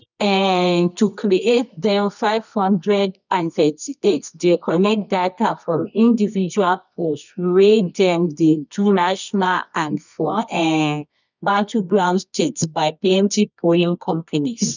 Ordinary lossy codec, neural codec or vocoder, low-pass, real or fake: none; codec, 24 kHz, 0.9 kbps, WavTokenizer, medium music audio release; 7.2 kHz; fake